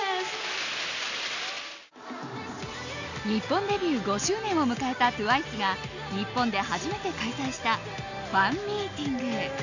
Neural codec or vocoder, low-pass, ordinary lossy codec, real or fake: none; 7.2 kHz; none; real